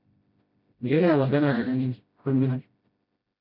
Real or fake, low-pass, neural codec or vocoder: fake; 5.4 kHz; codec, 16 kHz, 0.5 kbps, FreqCodec, smaller model